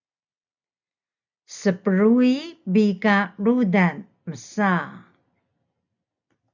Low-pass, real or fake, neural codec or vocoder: 7.2 kHz; real; none